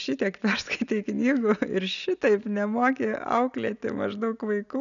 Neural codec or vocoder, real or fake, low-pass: none; real; 7.2 kHz